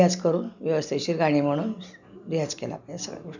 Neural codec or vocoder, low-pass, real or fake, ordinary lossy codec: none; 7.2 kHz; real; none